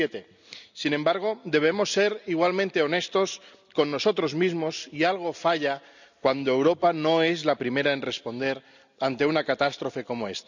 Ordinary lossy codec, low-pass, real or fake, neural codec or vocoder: none; 7.2 kHz; real; none